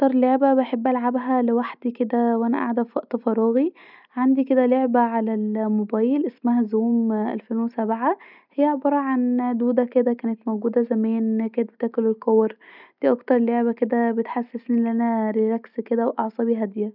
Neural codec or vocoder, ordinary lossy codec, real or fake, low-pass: none; none; real; 5.4 kHz